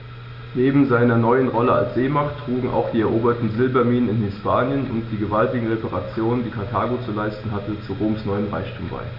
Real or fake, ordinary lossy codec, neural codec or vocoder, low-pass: real; AAC, 48 kbps; none; 5.4 kHz